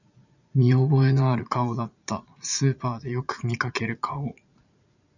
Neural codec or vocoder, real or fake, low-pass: vocoder, 44.1 kHz, 80 mel bands, Vocos; fake; 7.2 kHz